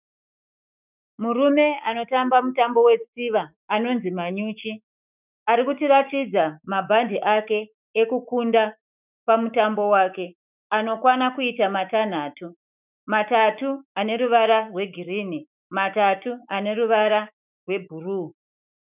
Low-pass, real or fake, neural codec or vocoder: 3.6 kHz; fake; autoencoder, 48 kHz, 128 numbers a frame, DAC-VAE, trained on Japanese speech